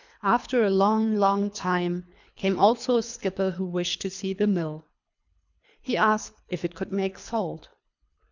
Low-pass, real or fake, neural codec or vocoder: 7.2 kHz; fake; codec, 24 kHz, 3 kbps, HILCodec